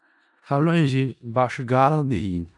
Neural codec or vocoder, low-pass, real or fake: codec, 16 kHz in and 24 kHz out, 0.4 kbps, LongCat-Audio-Codec, four codebook decoder; 10.8 kHz; fake